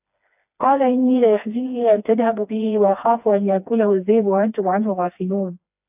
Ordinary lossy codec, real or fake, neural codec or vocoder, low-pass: AAC, 32 kbps; fake; codec, 16 kHz, 2 kbps, FreqCodec, smaller model; 3.6 kHz